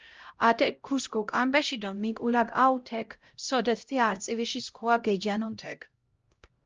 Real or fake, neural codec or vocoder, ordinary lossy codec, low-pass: fake; codec, 16 kHz, 0.5 kbps, X-Codec, HuBERT features, trained on LibriSpeech; Opus, 24 kbps; 7.2 kHz